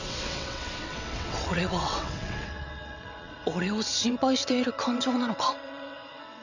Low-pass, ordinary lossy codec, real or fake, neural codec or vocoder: 7.2 kHz; none; fake; vocoder, 22.05 kHz, 80 mel bands, WaveNeXt